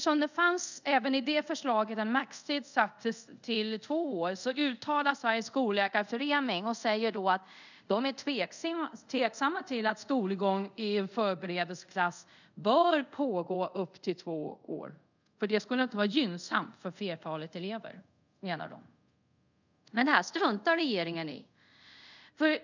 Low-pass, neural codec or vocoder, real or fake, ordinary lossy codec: 7.2 kHz; codec, 24 kHz, 0.5 kbps, DualCodec; fake; none